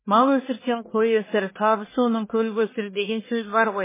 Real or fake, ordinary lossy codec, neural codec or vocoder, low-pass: fake; MP3, 16 kbps; codec, 44.1 kHz, 1.7 kbps, Pupu-Codec; 3.6 kHz